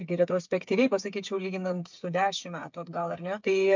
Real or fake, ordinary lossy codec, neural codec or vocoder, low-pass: fake; MP3, 64 kbps; codec, 16 kHz, 8 kbps, FreqCodec, smaller model; 7.2 kHz